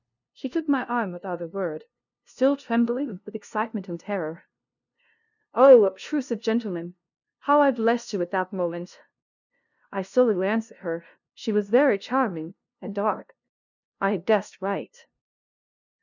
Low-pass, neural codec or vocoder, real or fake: 7.2 kHz; codec, 16 kHz, 0.5 kbps, FunCodec, trained on LibriTTS, 25 frames a second; fake